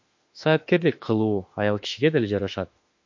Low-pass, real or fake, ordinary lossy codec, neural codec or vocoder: 7.2 kHz; fake; MP3, 48 kbps; autoencoder, 48 kHz, 32 numbers a frame, DAC-VAE, trained on Japanese speech